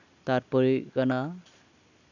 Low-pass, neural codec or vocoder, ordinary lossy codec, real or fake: 7.2 kHz; none; none; real